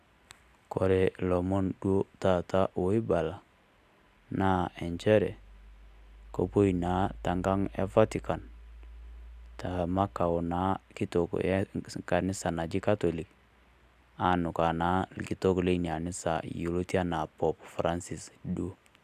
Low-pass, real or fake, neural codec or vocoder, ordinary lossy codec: 14.4 kHz; real; none; none